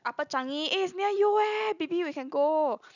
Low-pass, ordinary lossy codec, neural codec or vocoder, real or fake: 7.2 kHz; none; none; real